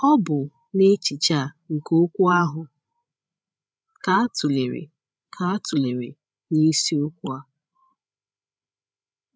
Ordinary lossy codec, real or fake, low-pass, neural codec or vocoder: none; fake; none; codec, 16 kHz, 16 kbps, FreqCodec, larger model